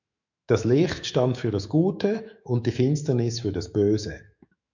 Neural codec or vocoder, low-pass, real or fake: autoencoder, 48 kHz, 128 numbers a frame, DAC-VAE, trained on Japanese speech; 7.2 kHz; fake